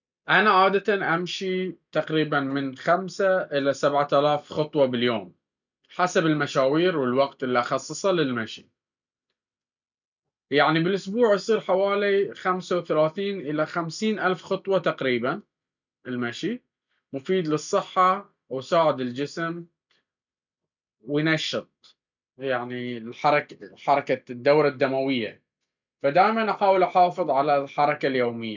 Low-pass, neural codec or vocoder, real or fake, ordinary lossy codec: 7.2 kHz; none; real; none